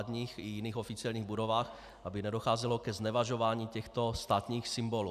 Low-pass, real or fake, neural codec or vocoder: 14.4 kHz; real; none